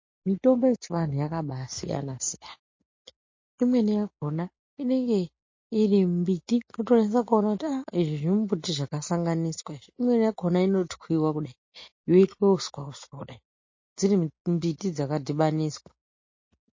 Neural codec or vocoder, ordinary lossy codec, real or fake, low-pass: none; MP3, 32 kbps; real; 7.2 kHz